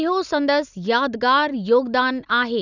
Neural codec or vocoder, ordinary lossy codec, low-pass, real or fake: none; none; 7.2 kHz; real